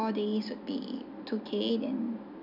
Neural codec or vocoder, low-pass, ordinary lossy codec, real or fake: none; 5.4 kHz; none; real